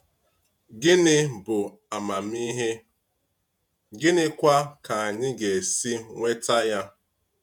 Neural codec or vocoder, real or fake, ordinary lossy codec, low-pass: vocoder, 48 kHz, 128 mel bands, Vocos; fake; none; 19.8 kHz